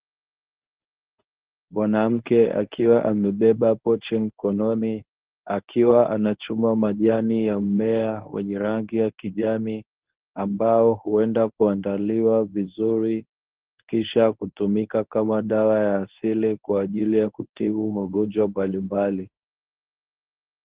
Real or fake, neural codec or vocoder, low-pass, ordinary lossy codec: fake; codec, 24 kHz, 0.9 kbps, WavTokenizer, medium speech release version 1; 3.6 kHz; Opus, 16 kbps